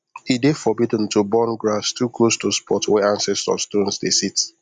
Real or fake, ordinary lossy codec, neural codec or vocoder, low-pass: real; none; none; 10.8 kHz